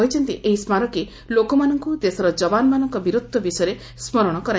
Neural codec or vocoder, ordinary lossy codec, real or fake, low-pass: none; none; real; none